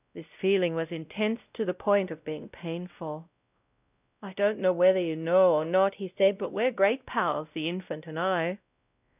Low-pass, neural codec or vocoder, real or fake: 3.6 kHz; codec, 16 kHz, 0.5 kbps, X-Codec, WavLM features, trained on Multilingual LibriSpeech; fake